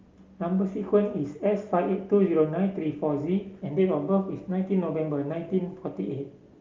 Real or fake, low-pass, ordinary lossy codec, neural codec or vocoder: real; 7.2 kHz; Opus, 24 kbps; none